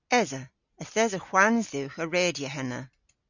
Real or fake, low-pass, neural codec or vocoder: real; 7.2 kHz; none